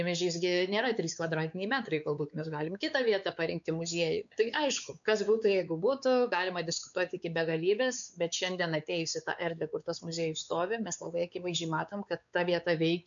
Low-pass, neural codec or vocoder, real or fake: 7.2 kHz; codec, 16 kHz, 4 kbps, X-Codec, WavLM features, trained on Multilingual LibriSpeech; fake